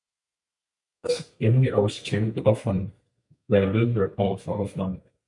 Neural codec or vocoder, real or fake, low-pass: codec, 32 kHz, 1.9 kbps, SNAC; fake; 10.8 kHz